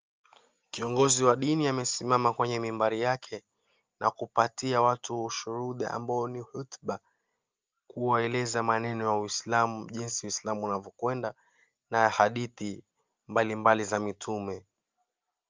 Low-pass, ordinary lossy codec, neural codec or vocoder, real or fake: 7.2 kHz; Opus, 24 kbps; none; real